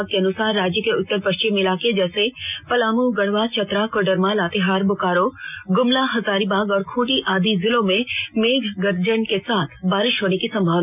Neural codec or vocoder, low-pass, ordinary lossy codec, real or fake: none; 3.6 kHz; none; real